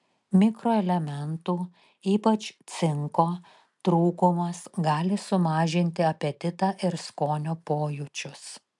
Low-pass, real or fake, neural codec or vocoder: 10.8 kHz; real; none